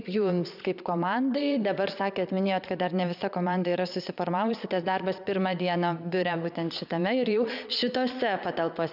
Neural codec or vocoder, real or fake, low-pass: vocoder, 22.05 kHz, 80 mel bands, Vocos; fake; 5.4 kHz